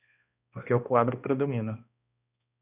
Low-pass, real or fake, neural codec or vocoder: 3.6 kHz; fake; codec, 16 kHz, 1 kbps, X-Codec, HuBERT features, trained on balanced general audio